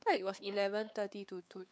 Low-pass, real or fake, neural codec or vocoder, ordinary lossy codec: none; fake; codec, 16 kHz, 4 kbps, X-Codec, WavLM features, trained on Multilingual LibriSpeech; none